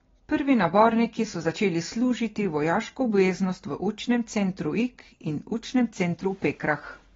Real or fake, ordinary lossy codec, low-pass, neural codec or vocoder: real; AAC, 24 kbps; 7.2 kHz; none